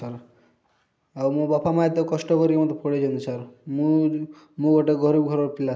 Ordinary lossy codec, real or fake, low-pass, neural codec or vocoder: none; real; none; none